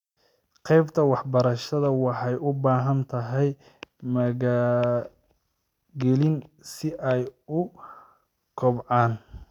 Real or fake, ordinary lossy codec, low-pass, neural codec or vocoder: real; none; 19.8 kHz; none